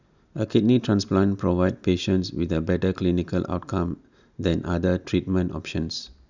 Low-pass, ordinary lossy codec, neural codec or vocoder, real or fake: 7.2 kHz; none; none; real